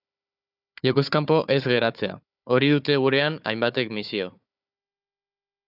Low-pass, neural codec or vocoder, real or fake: 5.4 kHz; codec, 16 kHz, 4 kbps, FunCodec, trained on Chinese and English, 50 frames a second; fake